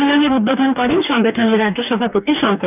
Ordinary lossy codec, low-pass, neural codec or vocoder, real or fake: none; 3.6 kHz; codec, 44.1 kHz, 2.6 kbps, DAC; fake